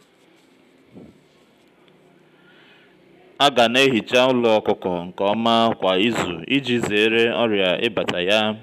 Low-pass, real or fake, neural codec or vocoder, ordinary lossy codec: 14.4 kHz; real; none; none